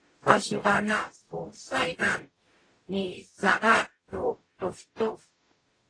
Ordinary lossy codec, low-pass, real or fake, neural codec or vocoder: AAC, 32 kbps; 9.9 kHz; fake; codec, 44.1 kHz, 0.9 kbps, DAC